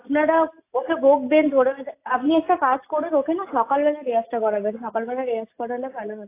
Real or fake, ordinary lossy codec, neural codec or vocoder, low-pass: real; AAC, 24 kbps; none; 3.6 kHz